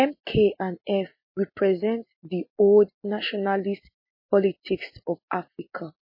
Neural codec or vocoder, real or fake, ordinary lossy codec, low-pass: none; real; MP3, 24 kbps; 5.4 kHz